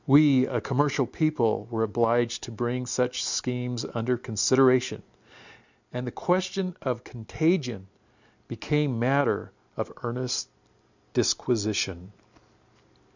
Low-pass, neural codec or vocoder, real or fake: 7.2 kHz; none; real